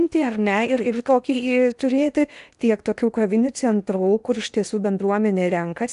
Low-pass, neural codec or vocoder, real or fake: 10.8 kHz; codec, 16 kHz in and 24 kHz out, 0.8 kbps, FocalCodec, streaming, 65536 codes; fake